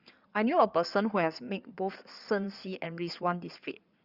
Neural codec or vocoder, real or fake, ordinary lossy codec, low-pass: codec, 16 kHz in and 24 kHz out, 2.2 kbps, FireRedTTS-2 codec; fake; Opus, 64 kbps; 5.4 kHz